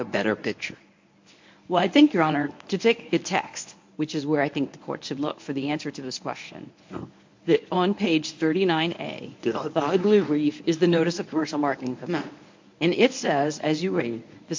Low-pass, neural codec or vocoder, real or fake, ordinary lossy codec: 7.2 kHz; codec, 24 kHz, 0.9 kbps, WavTokenizer, medium speech release version 1; fake; MP3, 48 kbps